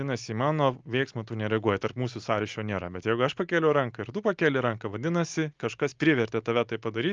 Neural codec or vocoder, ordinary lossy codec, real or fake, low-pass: none; Opus, 24 kbps; real; 7.2 kHz